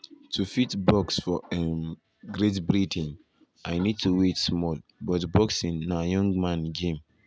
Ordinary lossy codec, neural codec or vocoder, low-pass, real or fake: none; none; none; real